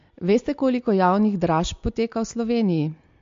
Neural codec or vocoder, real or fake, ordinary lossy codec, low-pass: none; real; MP3, 48 kbps; 7.2 kHz